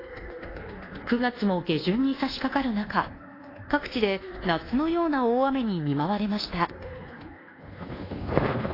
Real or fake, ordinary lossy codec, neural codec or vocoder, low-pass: fake; AAC, 24 kbps; codec, 24 kHz, 1.2 kbps, DualCodec; 5.4 kHz